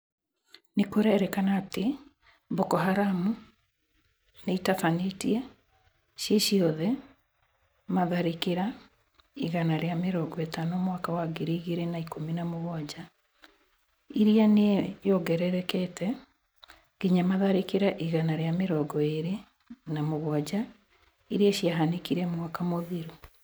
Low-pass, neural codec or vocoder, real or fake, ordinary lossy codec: none; none; real; none